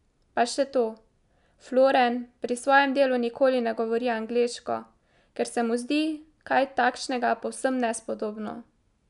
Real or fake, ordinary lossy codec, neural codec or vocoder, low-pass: real; none; none; 10.8 kHz